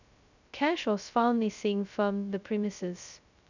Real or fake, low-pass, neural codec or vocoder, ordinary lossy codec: fake; 7.2 kHz; codec, 16 kHz, 0.2 kbps, FocalCodec; none